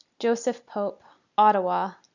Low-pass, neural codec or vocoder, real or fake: 7.2 kHz; none; real